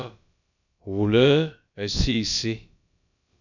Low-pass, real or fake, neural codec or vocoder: 7.2 kHz; fake; codec, 16 kHz, about 1 kbps, DyCAST, with the encoder's durations